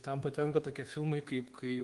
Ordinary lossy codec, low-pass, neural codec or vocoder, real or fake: Opus, 24 kbps; 10.8 kHz; codec, 24 kHz, 1.2 kbps, DualCodec; fake